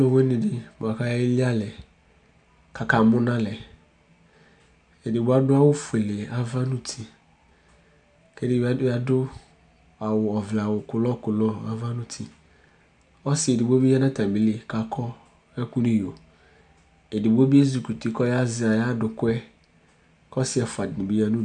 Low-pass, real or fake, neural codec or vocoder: 9.9 kHz; real; none